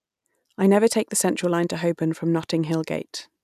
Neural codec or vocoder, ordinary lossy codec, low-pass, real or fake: none; none; 14.4 kHz; real